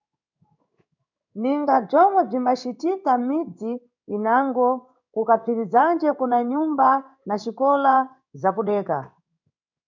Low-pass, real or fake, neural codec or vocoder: 7.2 kHz; fake; codec, 16 kHz in and 24 kHz out, 1 kbps, XY-Tokenizer